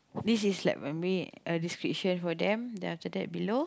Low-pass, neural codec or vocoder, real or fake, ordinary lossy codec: none; none; real; none